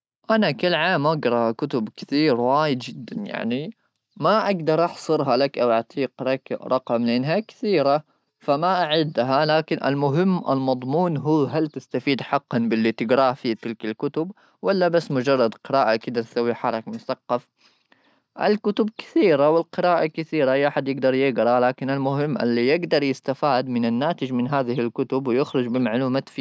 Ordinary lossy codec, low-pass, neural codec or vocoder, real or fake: none; none; none; real